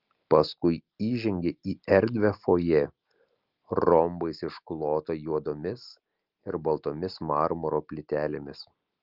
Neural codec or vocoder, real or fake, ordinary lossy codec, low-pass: none; real; Opus, 24 kbps; 5.4 kHz